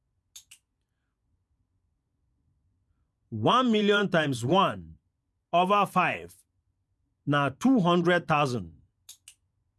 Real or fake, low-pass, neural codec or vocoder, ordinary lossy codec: fake; none; vocoder, 24 kHz, 100 mel bands, Vocos; none